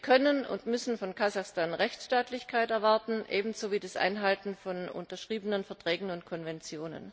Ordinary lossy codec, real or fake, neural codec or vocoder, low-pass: none; real; none; none